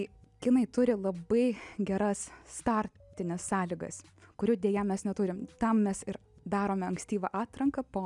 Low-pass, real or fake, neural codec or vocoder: 10.8 kHz; real; none